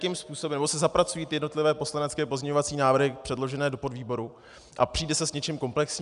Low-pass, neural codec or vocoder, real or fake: 10.8 kHz; none; real